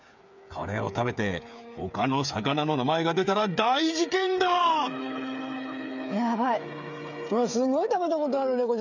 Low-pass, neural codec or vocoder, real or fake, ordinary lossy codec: 7.2 kHz; codec, 16 kHz, 8 kbps, FreqCodec, smaller model; fake; none